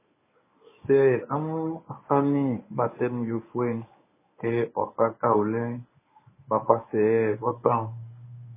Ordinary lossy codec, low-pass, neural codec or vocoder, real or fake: MP3, 16 kbps; 3.6 kHz; codec, 24 kHz, 0.9 kbps, WavTokenizer, medium speech release version 1; fake